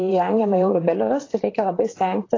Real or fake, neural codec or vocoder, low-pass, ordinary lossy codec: fake; vocoder, 44.1 kHz, 128 mel bands, Pupu-Vocoder; 7.2 kHz; AAC, 32 kbps